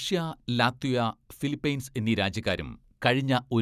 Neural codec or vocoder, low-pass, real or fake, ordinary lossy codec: none; 14.4 kHz; real; none